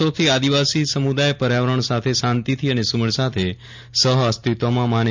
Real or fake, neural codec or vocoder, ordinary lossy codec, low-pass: real; none; MP3, 64 kbps; 7.2 kHz